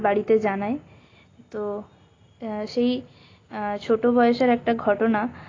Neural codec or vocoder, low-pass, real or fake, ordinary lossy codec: none; 7.2 kHz; real; AAC, 32 kbps